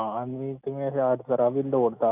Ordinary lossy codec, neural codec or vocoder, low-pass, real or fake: none; none; 3.6 kHz; real